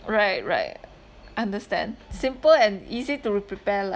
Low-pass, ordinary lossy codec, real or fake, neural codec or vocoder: none; none; real; none